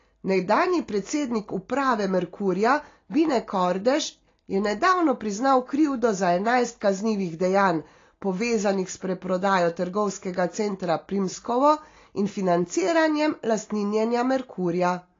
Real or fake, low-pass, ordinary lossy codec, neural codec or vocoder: real; 7.2 kHz; AAC, 32 kbps; none